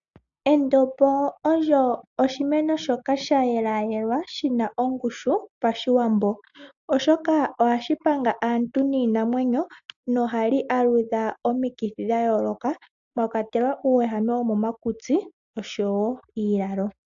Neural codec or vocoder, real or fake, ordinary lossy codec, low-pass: none; real; AAC, 64 kbps; 7.2 kHz